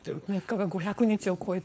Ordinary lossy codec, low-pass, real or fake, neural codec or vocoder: none; none; fake; codec, 16 kHz, 4.8 kbps, FACodec